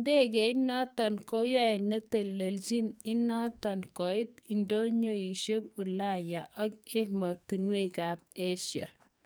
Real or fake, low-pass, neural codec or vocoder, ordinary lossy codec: fake; none; codec, 44.1 kHz, 2.6 kbps, SNAC; none